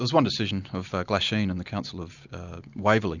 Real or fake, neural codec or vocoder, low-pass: real; none; 7.2 kHz